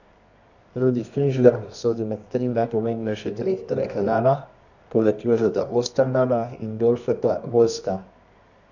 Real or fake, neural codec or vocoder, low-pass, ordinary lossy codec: fake; codec, 24 kHz, 0.9 kbps, WavTokenizer, medium music audio release; 7.2 kHz; none